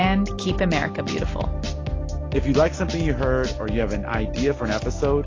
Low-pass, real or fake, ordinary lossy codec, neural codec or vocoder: 7.2 kHz; real; AAC, 32 kbps; none